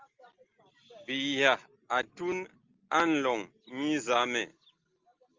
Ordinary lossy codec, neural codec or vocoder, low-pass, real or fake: Opus, 32 kbps; none; 7.2 kHz; real